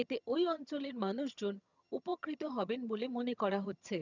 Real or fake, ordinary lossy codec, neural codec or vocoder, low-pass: fake; none; vocoder, 22.05 kHz, 80 mel bands, HiFi-GAN; 7.2 kHz